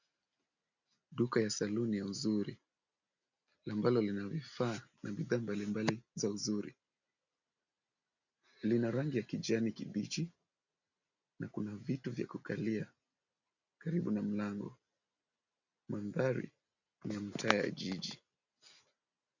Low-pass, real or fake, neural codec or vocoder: 7.2 kHz; real; none